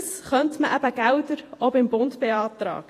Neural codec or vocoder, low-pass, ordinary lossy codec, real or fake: vocoder, 48 kHz, 128 mel bands, Vocos; 14.4 kHz; AAC, 48 kbps; fake